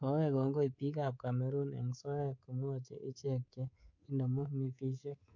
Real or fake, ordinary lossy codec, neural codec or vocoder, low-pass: fake; none; codec, 24 kHz, 3.1 kbps, DualCodec; 7.2 kHz